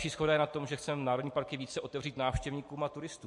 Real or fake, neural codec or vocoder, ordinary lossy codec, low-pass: real; none; MP3, 48 kbps; 10.8 kHz